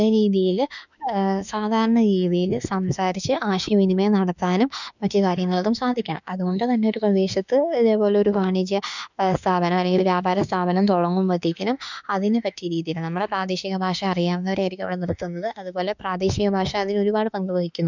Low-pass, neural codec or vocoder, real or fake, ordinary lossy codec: 7.2 kHz; autoencoder, 48 kHz, 32 numbers a frame, DAC-VAE, trained on Japanese speech; fake; none